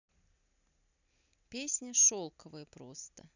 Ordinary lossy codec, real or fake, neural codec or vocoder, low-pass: none; real; none; 7.2 kHz